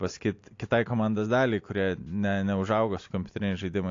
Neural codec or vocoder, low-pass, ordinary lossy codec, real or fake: none; 7.2 kHz; AAC, 48 kbps; real